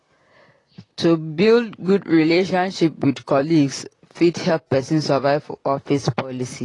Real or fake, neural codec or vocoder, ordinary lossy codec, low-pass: fake; vocoder, 44.1 kHz, 128 mel bands, Pupu-Vocoder; AAC, 32 kbps; 10.8 kHz